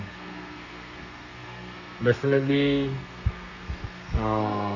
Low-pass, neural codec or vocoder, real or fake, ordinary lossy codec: 7.2 kHz; codec, 32 kHz, 1.9 kbps, SNAC; fake; none